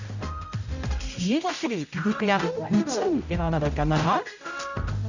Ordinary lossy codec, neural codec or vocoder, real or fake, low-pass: none; codec, 16 kHz, 0.5 kbps, X-Codec, HuBERT features, trained on general audio; fake; 7.2 kHz